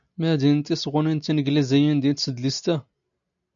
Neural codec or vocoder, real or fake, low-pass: none; real; 7.2 kHz